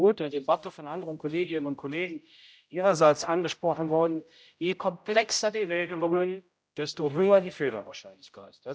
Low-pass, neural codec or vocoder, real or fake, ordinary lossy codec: none; codec, 16 kHz, 0.5 kbps, X-Codec, HuBERT features, trained on general audio; fake; none